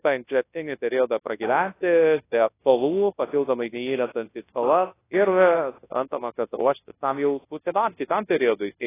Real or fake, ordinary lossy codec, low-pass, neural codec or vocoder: fake; AAC, 16 kbps; 3.6 kHz; codec, 24 kHz, 0.9 kbps, WavTokenizer, large speech release